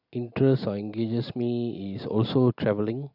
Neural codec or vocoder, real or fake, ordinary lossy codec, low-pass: none; real; none; 5.4 kHz